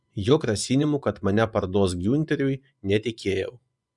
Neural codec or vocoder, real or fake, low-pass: vocoder, 24 kHz, 100 mel bands, Vocos; fake; 10.8 kHz